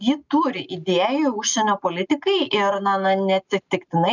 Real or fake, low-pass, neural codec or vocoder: real; 7.2 kHz; none